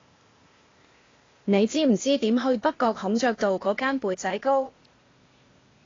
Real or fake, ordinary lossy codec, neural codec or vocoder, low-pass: fake; AAC, 32 kbps; codec, 16 kHz, 0.8 kbps, ZipCodec; 7.2 kHz